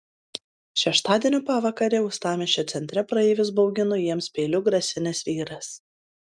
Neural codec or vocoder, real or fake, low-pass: none; real; 9.9 kHz